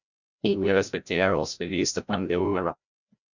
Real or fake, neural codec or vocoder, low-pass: fake; codec, 16 kHz, 0.5 kbps, FreqCodec, larger model; 7.2 kHz